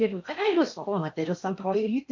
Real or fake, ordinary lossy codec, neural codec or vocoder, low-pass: fake; MP3, 48 kbps; codec, 16 kHz in and 24 kHz out, 0.8 kbps, FocalCodec, streaming, 65536 codes; 7.2 kHz